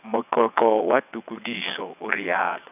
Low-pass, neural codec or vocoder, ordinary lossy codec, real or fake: 3.6 kHz; vocoder, 22.05 kHz, 80 mel bands, WaveNeXt; none; fake